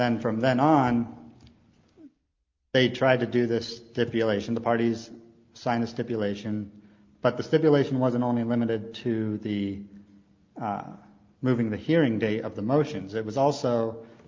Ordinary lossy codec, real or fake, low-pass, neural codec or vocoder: Opus, 24 kbps; real; 7.2 kHz; none